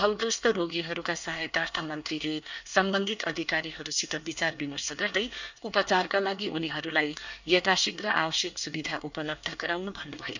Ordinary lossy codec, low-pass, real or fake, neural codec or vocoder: none; 7.2 kHz; fake; codec, 24 kHz, 1 kbps, SNAC